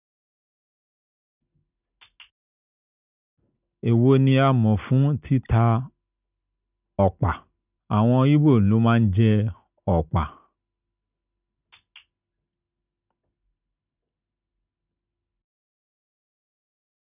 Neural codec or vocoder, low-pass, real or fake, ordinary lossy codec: none; 3.6 kHz; real; none